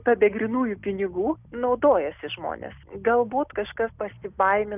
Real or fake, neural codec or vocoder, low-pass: real; none; 3.6 kHz